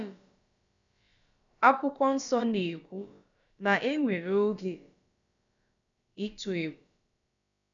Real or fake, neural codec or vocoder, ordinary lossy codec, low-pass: fake; codec, 16 kHz, about 1 kbps, DyCAST, with the encoder's durations; none; 7.2 kHz